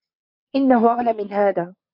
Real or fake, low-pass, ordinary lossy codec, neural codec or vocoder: fake; 5.4 kHz; MP3, 48 kbps; vocoder, 22.05 kHz, 80 mel bands, WaveNeXt